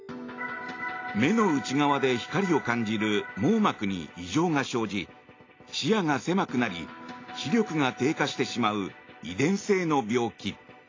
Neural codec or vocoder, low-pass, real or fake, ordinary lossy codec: none; 7.2 kHz; real; AAC, 32 kbps